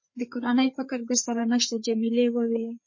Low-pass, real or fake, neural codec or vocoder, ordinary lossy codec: 7.2 kHz; fake; codec, 16 kHz, 4 kbps, FreqCodec, larger model; MP3, 32 kbps